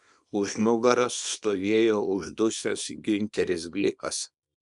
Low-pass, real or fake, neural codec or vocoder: 10.8 kHz; fake; codec, 24 kHz, 0.9 kbps, WavTokenizer, small release